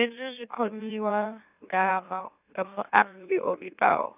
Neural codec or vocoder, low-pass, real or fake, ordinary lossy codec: autoencoder, 44.1 kHz, a latent of 192 numbers a frame, MeloTTS; 3.6 kHz; fake; none